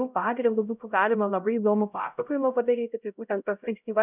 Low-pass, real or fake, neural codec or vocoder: 3.6 kHz; fake; codec, 16 kHz, 0.5 kbps, X-Codec, HuBERT features, trained on LibriSpeech